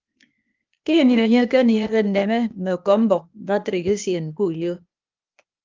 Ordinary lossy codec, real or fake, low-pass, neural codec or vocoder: Opus, 24 kbps; fake; 7.2 kHz; codec, 16 kHz, 0.8 kbps, ZipCodec